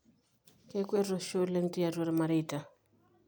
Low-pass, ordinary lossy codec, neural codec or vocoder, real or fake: none; none; none; real